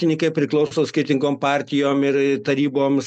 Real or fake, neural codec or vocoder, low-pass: real; none; 10.8 kHz